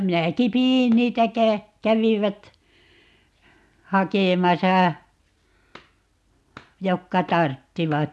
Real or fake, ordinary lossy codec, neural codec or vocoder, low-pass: real; none; none; none